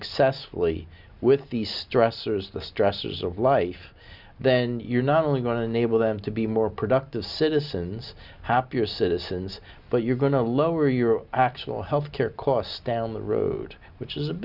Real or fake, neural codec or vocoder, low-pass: real; none; 5.4 kHz